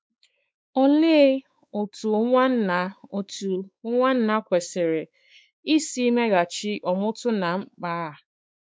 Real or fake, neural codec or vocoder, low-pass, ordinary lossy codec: fake; codec, 16 kHz, 4 kbps, X-Codec, WavLM features, trained on Multilingual LibriSpeech; none; none